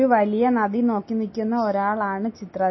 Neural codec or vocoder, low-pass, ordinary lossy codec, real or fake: none; 7.2 kHz; MP3, 24 kbps; real